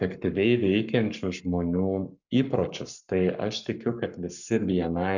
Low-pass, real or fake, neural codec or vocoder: 7.2 kHz; fake; codec, 44.1 kHz, 7.8 kbps, Pupu-Codec